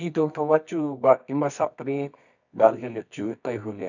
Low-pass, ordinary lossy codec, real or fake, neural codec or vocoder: 7.2 kHz; none; fake; codec, 24 kHz, 0.9 kbps, WavTokenizer, medium music audio release